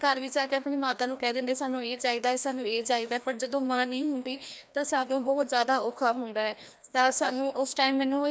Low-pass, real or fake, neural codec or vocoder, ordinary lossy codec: none; fake; codec, 16 kHz, 1 kbps, FreqCodec, larger model; none